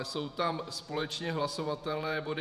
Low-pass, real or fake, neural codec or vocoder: 14.4 kHz; fake; vocoder, 48 kHz, 128 mel bands, Vocos